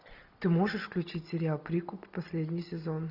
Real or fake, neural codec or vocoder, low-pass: real; none; 5.4 kHz